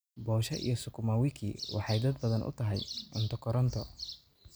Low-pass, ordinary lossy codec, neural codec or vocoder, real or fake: none; none; none; real